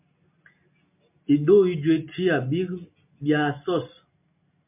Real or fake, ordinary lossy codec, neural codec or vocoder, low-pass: real; MP3, 32 kbps; none; 3.6 kHz